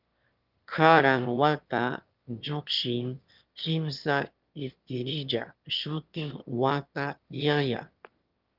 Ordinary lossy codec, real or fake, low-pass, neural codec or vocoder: Opus, 32 kbps; fake; 5.4 kHz; autoencoder, 22.05 kHz, a latent of 192 numbers a frame, VITS, trained on one speaker